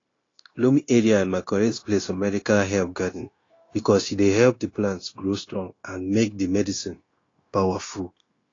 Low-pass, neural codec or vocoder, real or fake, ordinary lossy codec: 7.2 kHz; codec, 16 kHz, 0.9 kbps, LongCat-Audio-Codec; fake; AAC, 32 kbps